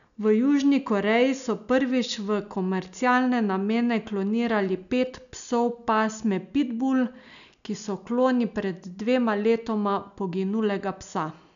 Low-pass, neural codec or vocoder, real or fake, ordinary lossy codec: 7.2 kHz; none; real; none